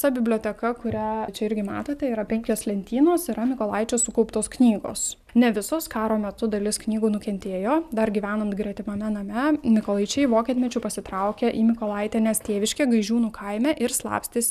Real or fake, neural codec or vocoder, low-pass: real; none; 14.4 kHz